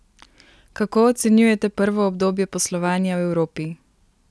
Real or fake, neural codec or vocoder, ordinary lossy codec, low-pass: real; none; none; none